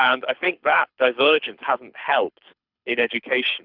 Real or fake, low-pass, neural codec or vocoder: fake; 5.4 kHz; codec, 24 kHz, 6 kbps, HILCodec